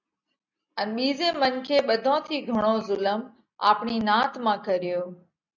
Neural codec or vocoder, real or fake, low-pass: none; real; 7.2 kHz